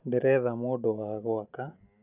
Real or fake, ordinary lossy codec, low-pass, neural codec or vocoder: real; none; 3.6 kHz; none